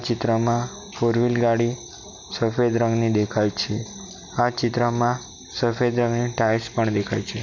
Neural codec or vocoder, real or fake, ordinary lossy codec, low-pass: none; real; MP3, 64 kbps; 7.2 kHz